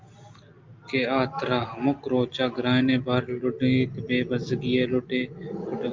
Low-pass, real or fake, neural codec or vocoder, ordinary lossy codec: 7.2 kHz; real; none; Opus, 24 kbps